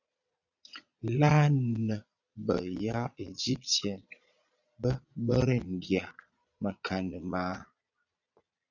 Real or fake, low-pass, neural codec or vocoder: fake; 7.2 kHz; vocoder, 22.05 kHz, 80 mel bands, Vocos